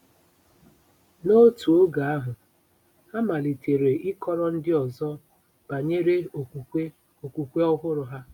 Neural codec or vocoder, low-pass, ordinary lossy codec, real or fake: none; 19.8 kHz; none; real